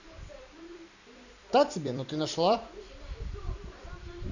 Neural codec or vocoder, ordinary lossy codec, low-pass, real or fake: vocoder, 44.1 kHz, 128 mel bands every 256 samples, BigVGAN v2; none; 7.2 kHz; fake